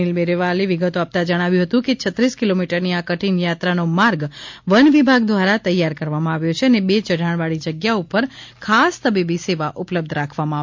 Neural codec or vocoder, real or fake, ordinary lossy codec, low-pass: none; real; MP3, 48 kbps; 7.2 kHz